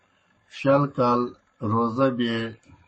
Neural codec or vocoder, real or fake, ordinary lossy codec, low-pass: codec, 44.1 kHz, 7.8 kbps, Pupu-Codec; fake; MP3, 32 kbps; 10.8 kHz